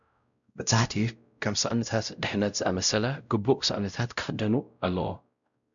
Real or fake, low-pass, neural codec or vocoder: fake; 7.2 kHz; codec, 16 kHz, 0.5 kbps, X-Codec, WavLM features, trained on Multilingual LibriSpeech